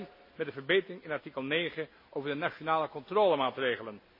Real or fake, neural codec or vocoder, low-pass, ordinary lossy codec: real; none; 5.4 kHz; MP3, 24 kbps